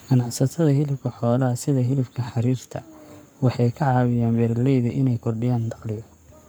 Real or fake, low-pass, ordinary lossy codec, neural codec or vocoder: fake; none; none; codec, 44.1 kHz, 7.8 kbps, Pupu-Codec